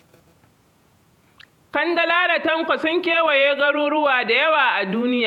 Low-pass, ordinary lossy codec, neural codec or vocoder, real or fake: 19.8 kHz; none; vocoder, 48 kHz, 128 mel bands, Vocos; fake